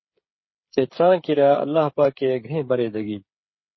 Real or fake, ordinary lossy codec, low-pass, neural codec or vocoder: fake; MP3, 24 kbps; 7.2 kHz; codec, 16 kHz, 8 kbps, FreqCodec, smaller model